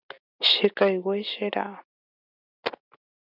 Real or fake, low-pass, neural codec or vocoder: real; 5.4 kHz; none